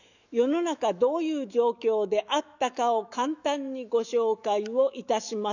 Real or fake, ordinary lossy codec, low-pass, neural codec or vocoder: fake; none; 7.2 kHz; autoencoder, 48 kHz, 128 numbers a frame, DAC-VAE, trained on Japanese speech